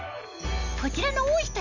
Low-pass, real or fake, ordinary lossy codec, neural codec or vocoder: 7.2 kHz; real; none; none